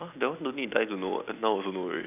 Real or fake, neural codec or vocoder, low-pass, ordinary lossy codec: fake; vocoder, 44.1 kHz, 128 mel bands every 256 samples, BigVGAN v2; 3.6 kHz; none